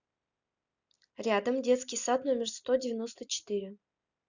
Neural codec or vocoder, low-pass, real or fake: none; 7.2 kHz; real